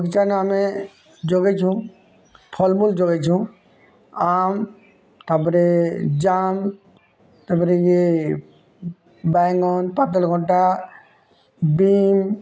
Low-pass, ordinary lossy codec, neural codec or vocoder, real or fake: none; none; none; real